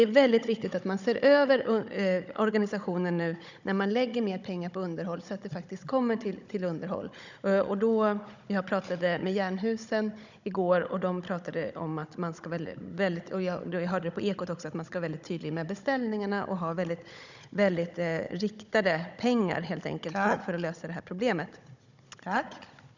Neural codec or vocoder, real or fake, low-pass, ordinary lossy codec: codec, 16 kHz, 16 kbps, FunCodec, trained on Chinese and English, 50 frames a second; fake; 7.2 kHz; none